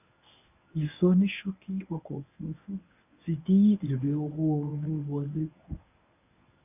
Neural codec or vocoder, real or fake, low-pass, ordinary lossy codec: codec, 24 kHz, 0.9 kbps, WavTokenizer, medium speech release version 1; fake; 3.6 kHz; none